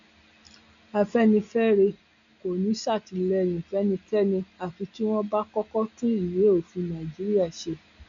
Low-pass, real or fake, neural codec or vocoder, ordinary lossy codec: 7.2 kHz; real; none; none